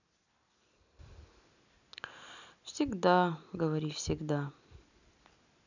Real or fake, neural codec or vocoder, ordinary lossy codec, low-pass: real; none; none; 7.2 kHz